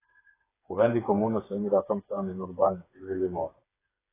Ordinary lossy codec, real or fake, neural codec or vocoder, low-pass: AAC, 16 kbps; fake; codec, 16 kHz, 4 kbps, FreqCodec, smaller model; 3.6 kHz